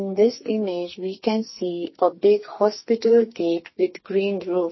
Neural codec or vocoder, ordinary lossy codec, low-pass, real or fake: codec, 44.1 kHz, 2.6 kbps, SNAC; MP3, 24 kbps; 7.2 kHz; fake